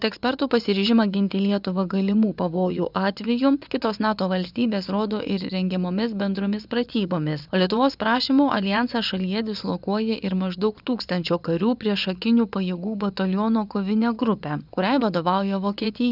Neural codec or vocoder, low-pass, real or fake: vocoder, 24 kHz, 100 mel bands, Vocos; 5.4 kHz; fake